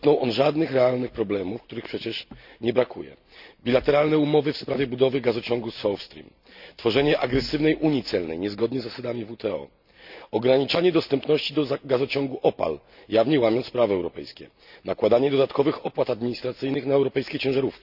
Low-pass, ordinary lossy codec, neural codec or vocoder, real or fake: 5.4 kHz; none; none; real